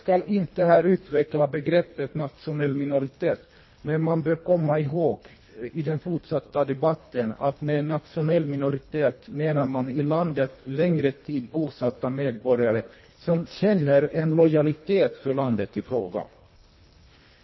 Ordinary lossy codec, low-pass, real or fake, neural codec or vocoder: MP3, 24 kbps; 7.2 kHz; fake; codec, 24 kHz, 1.5 kbps, HILCodec